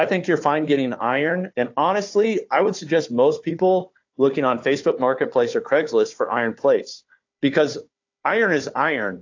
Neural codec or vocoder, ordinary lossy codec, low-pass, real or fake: codec, 16 kHz, 4 kbps, FunCodec, trained on Chinese and English, 50 frames a second; AAC, 48 kbps; 7.2 kHz; fake